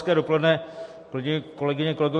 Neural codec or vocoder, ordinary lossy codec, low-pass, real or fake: none; MP3, 48 kbps; 14.4 kHz; real